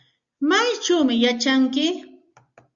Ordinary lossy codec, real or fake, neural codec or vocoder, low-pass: Opus, 64 kbps; real; none; 7.2 kHz